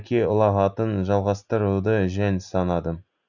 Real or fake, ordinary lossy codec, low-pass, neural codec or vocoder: real; none; 7.2 kHz; none